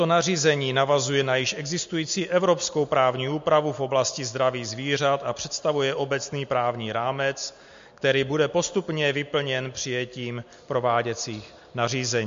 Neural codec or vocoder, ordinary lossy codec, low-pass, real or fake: none; MP3, 48 kbps; 7.2 kHz; real